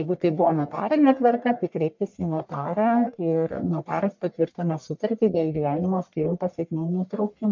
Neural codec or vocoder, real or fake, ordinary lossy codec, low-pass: codec, 44.1 kHz, 1.7 kbps, Pupu-Codec; fake; MP3, 64 kbps; 7.2 kHz